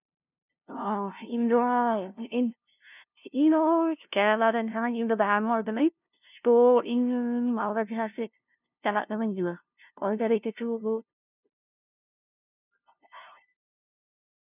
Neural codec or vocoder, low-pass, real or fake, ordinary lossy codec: codec, 16 kHz, 0.5 kbps, FunCodec, trained on LibriTTS, 25 frames a second; 3.6 kHz; fake; none